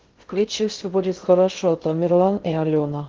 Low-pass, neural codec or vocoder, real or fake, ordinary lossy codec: 7.2 kHz; codec, 16 kHz in and 24 kHz out, 0.6 kbps, FocalCodec, streaming, 2048 codes; fake; Opus, 16 kbps